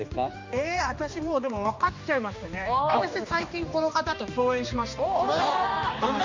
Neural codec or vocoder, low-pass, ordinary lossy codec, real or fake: codec, 16 kHz, 2 kbps, X-Codec, HuBERT features, trained on general audio; 7.2 kHz; MP3, 48 kbps; fake